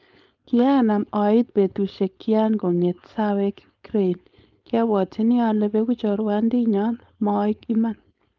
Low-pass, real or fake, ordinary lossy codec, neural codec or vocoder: 7.2 kHz; fake; Opus, 24 kbps; codec, 16 kHz, 4.8 kbps, FACodec